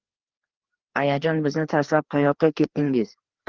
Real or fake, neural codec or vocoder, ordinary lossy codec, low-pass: fake; codec, 16 kHz, 2 kbps, FreqCodec, larger model; Opus, 16 kbps; 7.2 kHz